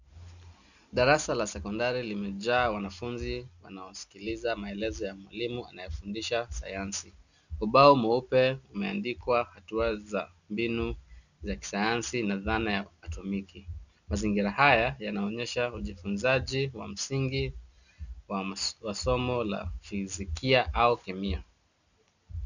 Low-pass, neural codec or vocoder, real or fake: 7.2 kHz; none; real